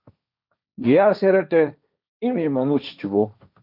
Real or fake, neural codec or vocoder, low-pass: fake; codec, 16 kHz, 1.1 kbps, Voila-Tokenizer; 5.4 kHz